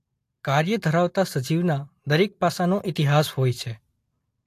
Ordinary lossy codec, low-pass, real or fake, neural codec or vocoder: AAC, 64 kbps; 14.4 kHz; real; none